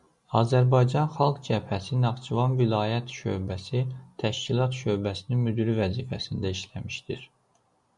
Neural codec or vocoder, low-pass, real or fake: none; 10.8 kHz; real